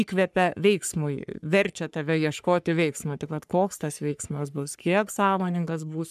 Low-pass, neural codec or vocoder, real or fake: 14.4 kHz; codec, 44.1 kHz, 3.4 kbps, Pupu-Codec; fake